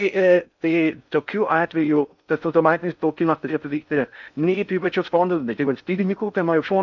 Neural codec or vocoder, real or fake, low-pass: codec, 16 kHz in and 24 kHz out, 0.6 kbps, FocalCodec, streaming, 4096 codes; fake; 7.2 kHz